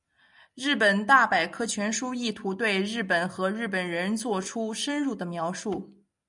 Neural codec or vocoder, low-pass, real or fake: none; 10.8 kHz; real